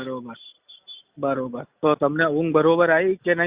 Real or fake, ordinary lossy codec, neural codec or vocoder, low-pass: real; Opus, 64 kbps; none; 3.6 kHz